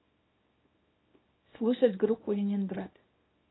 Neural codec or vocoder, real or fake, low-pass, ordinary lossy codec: codec, 24 kHz, 0.9 kbps, WavTokenizer, small release; fake; 7.2 kHz; AAC, 16 kbps